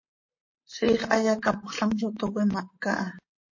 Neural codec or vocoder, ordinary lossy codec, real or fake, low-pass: vocoder, 44.1 kHz, 128 mel bands, Pupu-Vocoder; MP3, 32 kbps; fake; 7.2 kHz